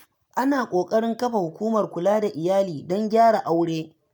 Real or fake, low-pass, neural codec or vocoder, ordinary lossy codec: real; none; none; none